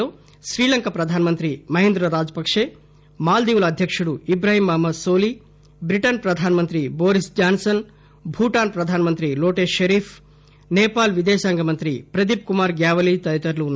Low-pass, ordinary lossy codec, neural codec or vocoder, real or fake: none; none; none; real